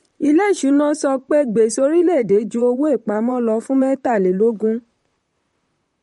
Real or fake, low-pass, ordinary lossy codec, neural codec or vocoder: fake; 19.8 kHz; MP3, 48 kbps; vocoder, 44.1 kHz, 128 mel bands, Pupu-Vocoder